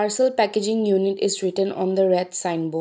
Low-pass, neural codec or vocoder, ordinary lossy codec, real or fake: none; none; none; real